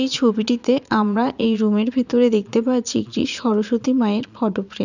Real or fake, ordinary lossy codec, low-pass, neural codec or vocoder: fake; none; 7.2 kHz; vocoder, 22.05 kHz, 80 mel bands, Vocos